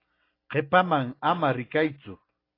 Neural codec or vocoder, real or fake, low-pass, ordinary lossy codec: none; real; 5.4 kHz; AAC, 24 kbps